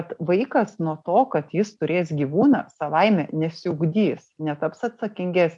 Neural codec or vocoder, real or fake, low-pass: none; real; 10.8 kHz